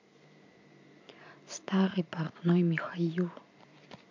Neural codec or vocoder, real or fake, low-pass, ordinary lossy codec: vocoder, 44.1 kHz, 128 mel bands every 512 samples, BigVGAN v2; fake; 7.2 kHz; MP3, 48 kbps